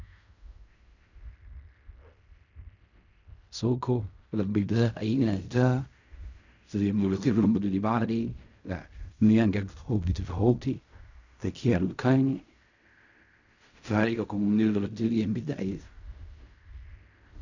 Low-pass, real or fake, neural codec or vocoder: 7.2 kHz; fake; codec, 16 kHz in and 24 kHz out, 0.4 kbps, LongCat-Audio-Codec, fine tuned four codebook decoder